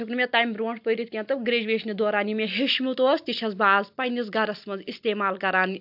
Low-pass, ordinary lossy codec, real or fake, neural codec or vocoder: 5.4 kHz; AAC, 48 kbps; real; none